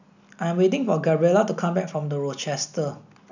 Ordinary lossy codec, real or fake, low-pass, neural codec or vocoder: none; real; 7.2 kHz; none